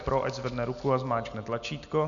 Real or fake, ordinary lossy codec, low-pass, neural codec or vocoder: real; MP3, 96 kbps; 7.2 kHz; none